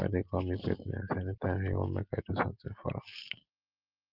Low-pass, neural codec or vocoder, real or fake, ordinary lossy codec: 5.4 kHz; none; real; Opus, 32 kbps